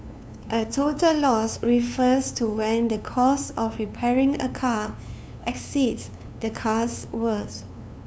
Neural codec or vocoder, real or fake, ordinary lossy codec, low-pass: codec, 16 kHz, 2 kbps, FunCodec, trained on LibriTTS, 25 frames a second; fake; none; none